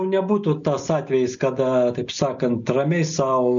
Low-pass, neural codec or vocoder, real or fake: 7.2 kHz; none; real